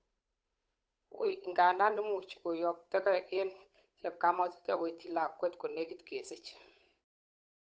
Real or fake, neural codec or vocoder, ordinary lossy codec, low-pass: fake; codec, 16 kHz, 8 kbps, FunCodec, trained on Chinese and English, 25 frames a second; none; none